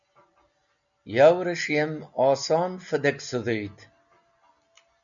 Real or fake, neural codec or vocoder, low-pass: real; none; 7.2 kHz